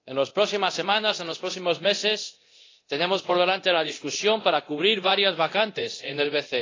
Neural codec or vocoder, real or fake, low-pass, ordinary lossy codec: codec, 24 kHz, 0.9 kbps, DualCodec; fake; 7.2 kHz; AAC, 32 kbps